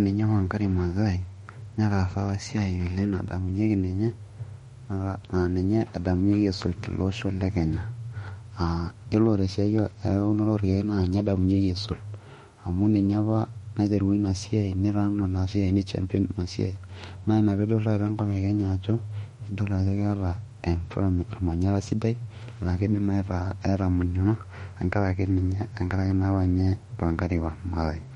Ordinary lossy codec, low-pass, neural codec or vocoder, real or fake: MP3, 48 kbps; 19.8 kHz; autoencoder, 48 kHz, 32 numbers a frame, DAC-VAE, trained on Japanese speech; fake